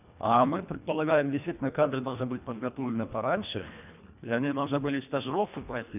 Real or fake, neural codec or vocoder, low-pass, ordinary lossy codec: fake; codec, 24 kHz, 1.5 kbps, HILCodec; 3.6 kHz; none